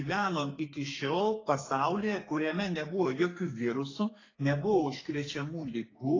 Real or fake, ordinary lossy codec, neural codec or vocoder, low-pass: fake; AAC, 32 kbps; codec, 32 kHz, 1.9 kbps, SNAC; 7.2 kHz